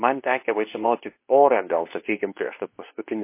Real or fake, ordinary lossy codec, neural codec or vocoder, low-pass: fake; MP3, 24 kbps; codec, 24 kHz, 0.9 kbps, WavTokenizer, medium speech release version 2; 3.6 kHz